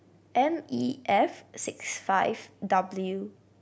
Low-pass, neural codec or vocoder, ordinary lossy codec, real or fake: none; none; none; real